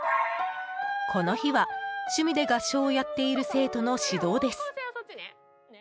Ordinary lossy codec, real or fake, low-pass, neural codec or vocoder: none; real; none; none